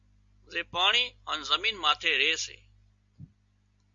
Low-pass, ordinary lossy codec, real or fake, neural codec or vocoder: 7.2 kHz; Opus, 64 kbps; real; none